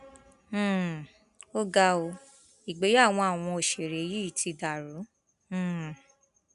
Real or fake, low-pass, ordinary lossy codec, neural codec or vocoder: real; 10.8 kHz; none; none